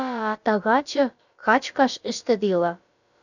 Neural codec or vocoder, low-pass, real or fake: codec, 16 kHz, about 1 kbps, DyCAST, with the encoder's durations; 7.2 kHz; fake